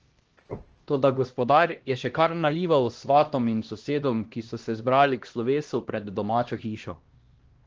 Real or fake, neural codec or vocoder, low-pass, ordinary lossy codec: fake; codec, 16 kHz, 1 kbps, X-Codec, HuBERT features, trained on LibriSpeech; 7.2 kHz; Opus, 16 kbps